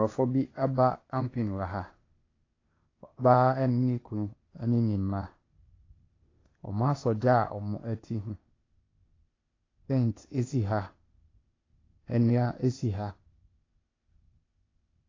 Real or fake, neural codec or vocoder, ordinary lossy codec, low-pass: fake; codec, 16 kHz, 0.8 kbps, ZipCodec; AAC, 32 kbps; 7.2 kHz